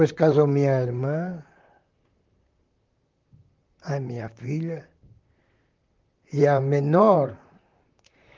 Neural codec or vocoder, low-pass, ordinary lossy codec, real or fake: vocoder, 44.1 kHz, 128 mel bands, Pupu-Vocoder; 7.2 kHz; Opus, 32 kbps; fake